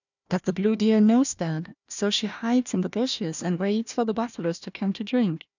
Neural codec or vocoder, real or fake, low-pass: codec, 16 kHz, 1 kbps, FunCodec, trained on Chinese and English, 50 frames a second; fake; 7.2 kHz